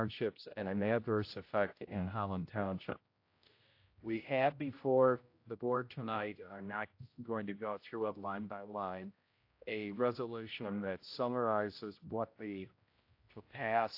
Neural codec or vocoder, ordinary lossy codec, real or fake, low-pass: codec, 16 kHz, 0.5 kbps, X-Codec, HuBERT features, trained on general audio; AAC, 32 kbps; fake; 5.4 kHz